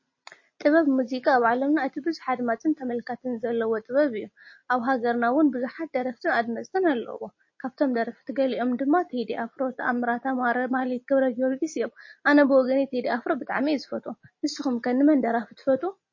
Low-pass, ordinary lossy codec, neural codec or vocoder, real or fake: 7.2 kHz; MP3, 32 kbps; none; real